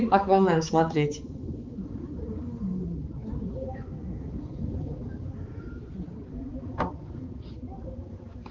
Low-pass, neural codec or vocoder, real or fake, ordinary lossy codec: 7.2 kHz; codec, 16 kHz, 4 kbps, X-Codec, HuBERT features, trained on balanced general audio; fake; Opus, 32 kbps